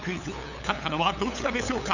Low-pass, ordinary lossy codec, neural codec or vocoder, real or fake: 7.2 kHz; none; codec, 16 kHz, 8 kbps, FunCodec, trained on LibriTTS, 25 frames a second; fake